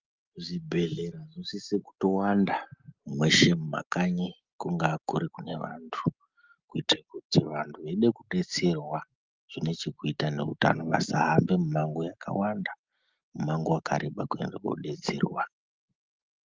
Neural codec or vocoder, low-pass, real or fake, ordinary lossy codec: none; 7.2 kHz; real; Opus, 32 kbps